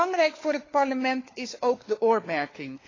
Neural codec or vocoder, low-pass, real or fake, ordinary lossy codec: codec, 16 kHz, 4 kbps, FunCodec, trained on LibriTTS, 50 frames a second; 7.2 kHz; fake; AAC, 32 kbps